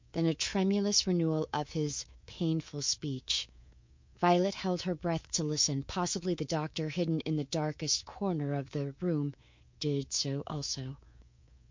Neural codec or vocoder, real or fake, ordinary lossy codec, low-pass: codec, 24 kHz, 3.1 kbps, DualCodec; fake; MP3, 48 kbps; 7.2 kHz